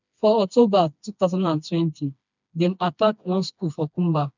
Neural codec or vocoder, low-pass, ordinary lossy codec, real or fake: codec, 16 kHz, 2 kbps, FreqCodec, smaller model; 7.2 kHz; none; fake